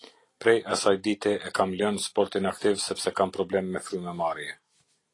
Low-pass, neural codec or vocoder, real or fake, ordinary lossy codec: 10.8 kHz; none; real; AAC, 32 kbps